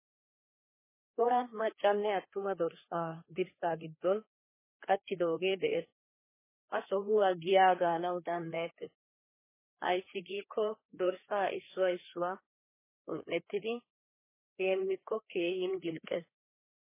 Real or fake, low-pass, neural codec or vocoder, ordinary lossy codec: fake; 3.6 kHz; codec, 16 kHz, 2 kbps, FreqCodec, larger model; MP3, 16 kbps